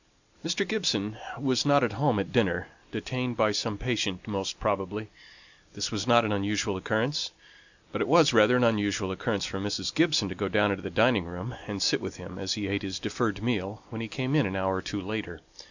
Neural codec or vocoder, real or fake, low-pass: none; real; 7.2 kHz